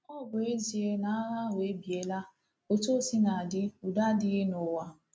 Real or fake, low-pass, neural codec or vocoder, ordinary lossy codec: real; none; none; none